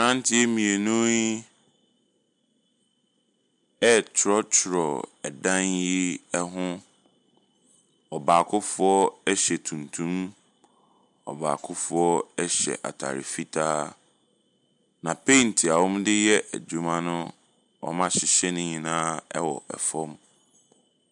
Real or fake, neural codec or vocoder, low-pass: real; none; 10.8 kHz